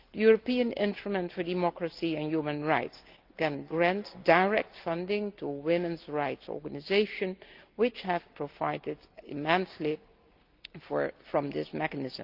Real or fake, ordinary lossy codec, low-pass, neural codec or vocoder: real; Opus, 32 kbps; 5.4 kHz; none